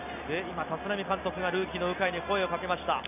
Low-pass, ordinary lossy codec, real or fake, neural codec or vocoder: 3.6 kHz; none; real; none